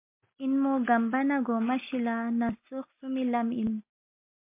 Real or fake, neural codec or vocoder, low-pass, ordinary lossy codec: real; none; 3.6 kHz; MP3, 32 kbps